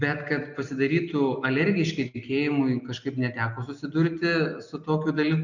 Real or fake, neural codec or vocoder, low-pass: real; none; 7.2 kHz